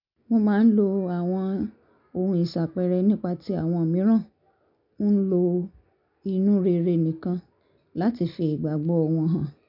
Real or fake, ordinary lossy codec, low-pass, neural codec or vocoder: real; none; 5.4 kHz; none